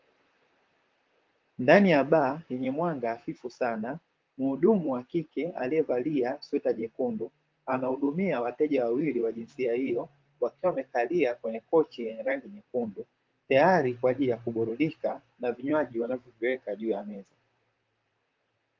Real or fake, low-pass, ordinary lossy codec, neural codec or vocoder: fake; 7.2 kHz; Opus, 24 kbps; vocoder, 44.1 kHz, 128 mel bands, Pupu-Vocoder